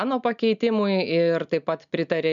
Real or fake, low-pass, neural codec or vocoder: real; 7.2 kHz; none